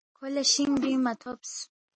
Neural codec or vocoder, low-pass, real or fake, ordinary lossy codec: none; 9.9 kHz; real; MP3, 32 kbps